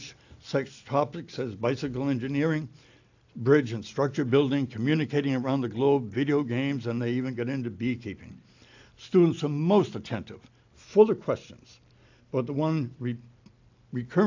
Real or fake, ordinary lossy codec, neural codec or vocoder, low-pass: real; AAC, 48 kbps; none; 7.2 kHz